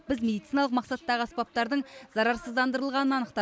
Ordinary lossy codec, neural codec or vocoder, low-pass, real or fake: none; none; none; real